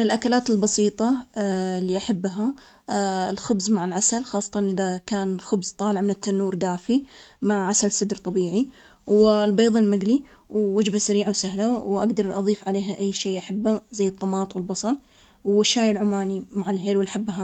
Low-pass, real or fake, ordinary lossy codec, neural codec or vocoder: 19.8 kHz; fake; none; codec, 44.1 kHz, 7.8 kbps, DAC